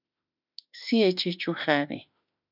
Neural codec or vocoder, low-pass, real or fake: autoencoder, 48 kHz, 32 numbers a frame, DAC-VAE, trained on Japanese speech; 5.4 kHz; fake